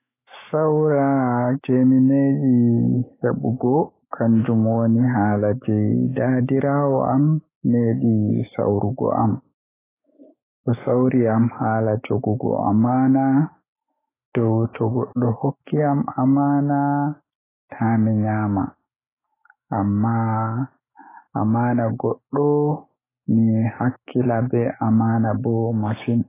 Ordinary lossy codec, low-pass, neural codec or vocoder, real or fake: AAC, 16 kbps; 3.6 kHz; autoencoder, 48 kHz, 128 numbers a frame, DAC-VAE, trained on Japanese speech; fake